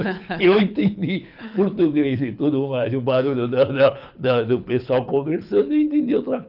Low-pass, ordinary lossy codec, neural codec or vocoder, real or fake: 5.4 kHz; none; codec, 24 kHz, 6 kbps, HILCodec; fake